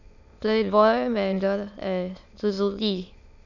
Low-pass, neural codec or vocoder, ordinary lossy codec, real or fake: 7.2 kHz; autoencoder, 22.05 kHz, a latent of 192 numbers a frame, VITS, trained on many speakers; none; fake